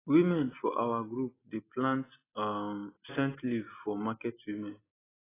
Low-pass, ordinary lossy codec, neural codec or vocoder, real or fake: 3.6 kHz; AAC, 16 kbps; none; real